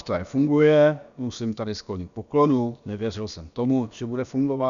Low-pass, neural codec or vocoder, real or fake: 7.2 kHz; codec, 16 kHz, about 1 kbps, DyCAST, with the encoder's durations; fake